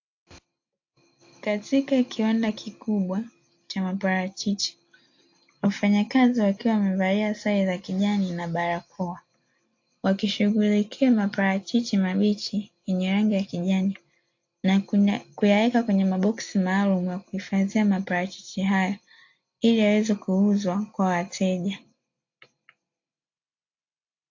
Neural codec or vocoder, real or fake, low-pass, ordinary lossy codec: none; real; 7.2 kHz; AAC, 48 kbps